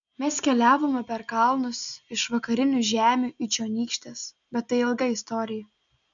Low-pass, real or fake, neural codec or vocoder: 7.2 kHz; real; none